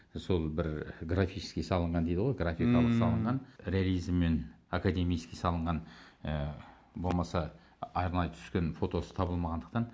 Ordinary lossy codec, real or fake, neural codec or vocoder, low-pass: none; real; none; none